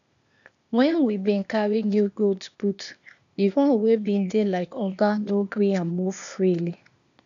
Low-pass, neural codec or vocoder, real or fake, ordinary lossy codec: 7.2 kHz; codec, 16 kHz, 0.8 kbps, ZipCodec; fake; none